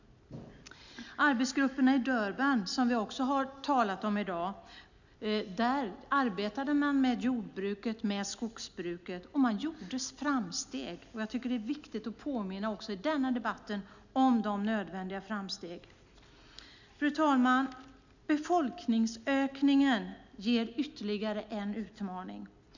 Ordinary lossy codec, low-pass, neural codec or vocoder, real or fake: none; 7.2 kHz; none; real